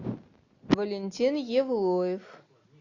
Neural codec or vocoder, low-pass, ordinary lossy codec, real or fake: none; 7.2 kHz; AAC, 48 kbps; real